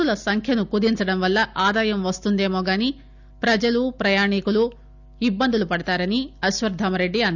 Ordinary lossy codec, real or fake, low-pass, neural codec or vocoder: none; real; 7.2 kHz; none